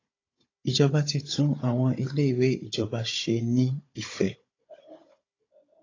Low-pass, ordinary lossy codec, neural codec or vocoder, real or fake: 7.2 kHz; AAC, 32 kbps; codec, 16 kHz, 16 kbps, FunCodec, trained on Chinese and English, 50 frames a second; fake